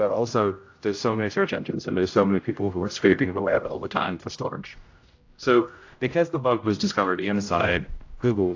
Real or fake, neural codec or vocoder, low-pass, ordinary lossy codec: fake; codec, 16 kHz, 0.5 kbps, X-Codec, HuBERT features, trained on general audio; 7.2 kHz; AAC, 48 kbps